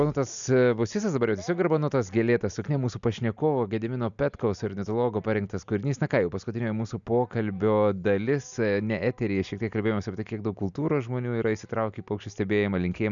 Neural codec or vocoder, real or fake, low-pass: none; real; 7.2 kHz